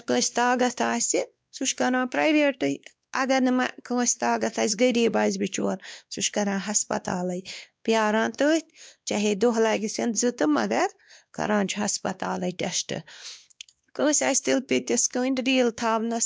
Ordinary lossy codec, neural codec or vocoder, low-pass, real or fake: none; codec, 16 kHz, 2 kbps, X-Codec, WavLM features, trained on Multilingual LibriSpeech; none; fake